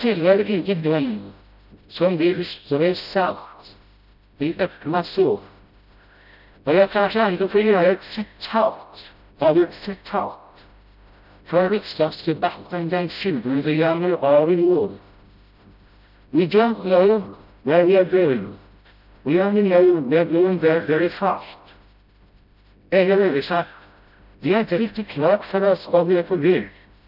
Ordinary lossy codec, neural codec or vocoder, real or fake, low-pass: none; codec, 16 kHz, 0.5 kbps, FreqCodec, smaller model; fake; 5.4 kHz